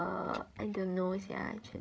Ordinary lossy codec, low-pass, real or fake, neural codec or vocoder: none; none; fake; codec, 16 kHz, 16 kbps, FreqCodec, larger model